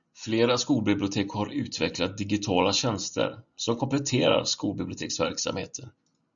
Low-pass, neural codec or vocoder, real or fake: 7.2 kHz; none; real